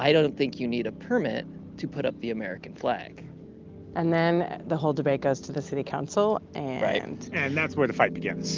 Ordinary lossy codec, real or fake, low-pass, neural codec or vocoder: Opus, 32 kbps; real; 7.2 kHz; none